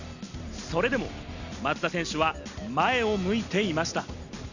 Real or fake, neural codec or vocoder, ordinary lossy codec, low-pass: real; none; none; 7.2 kHz